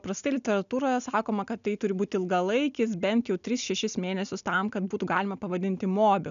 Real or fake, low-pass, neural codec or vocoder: real; 7.2 kHz; none